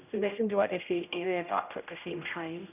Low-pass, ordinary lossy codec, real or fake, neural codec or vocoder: 3.6 kHz; none; fake; codec, 16 kHz, 0.5 kbps, X-Codec, HuBERT features, trained on general audio